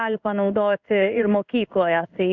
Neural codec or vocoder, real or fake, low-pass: codec, 16 kHz in and 24 kHz out, 1 kbps, XY-Tokenizer; fake; 7.2 kHz